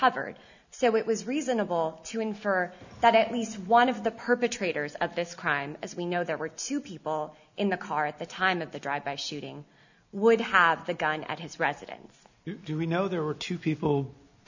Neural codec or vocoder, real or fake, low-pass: none; real; 7.2 kHz